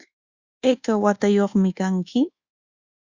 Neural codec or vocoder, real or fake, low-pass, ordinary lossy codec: codec, 24 kHz, 1.2 kbps, DualCodec; fake; 7.2 kHz; Opus, 64 kbps